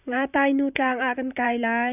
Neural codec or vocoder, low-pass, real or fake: vocoder, 44.1 kHz, 128 mel bands, Pupu-Vocoder; 3.6 kHz; fake